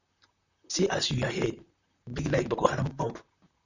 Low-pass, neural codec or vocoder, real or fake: 7.2 kHz; vocoder, 44.1 kHz, 128 mel bands, Pupu-Vocoder; fake